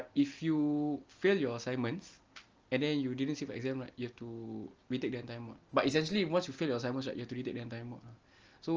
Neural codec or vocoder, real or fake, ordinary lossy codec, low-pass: none; real; Opus, 24 kbps; 7.2 kHz